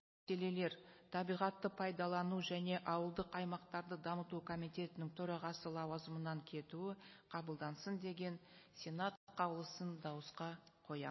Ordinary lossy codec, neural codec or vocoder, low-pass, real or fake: MP3, 24 kbps; none; 7.2 kHz; real